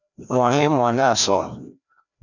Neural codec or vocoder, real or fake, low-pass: codec, 16 kHz, 1 kbps, FreqCodec, larger model; fake; 7.2 kHz